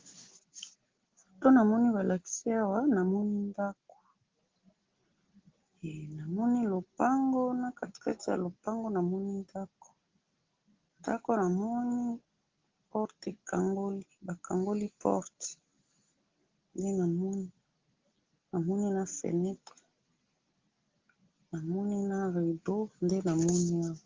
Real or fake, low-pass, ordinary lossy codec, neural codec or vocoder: real; 7.2 kHz; Opus, 16 kbps; none